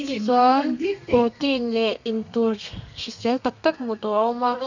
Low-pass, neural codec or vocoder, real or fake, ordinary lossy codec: 7.2 kHz; codec, 32 kHz, 1.9 kbps, SNAC; fake; none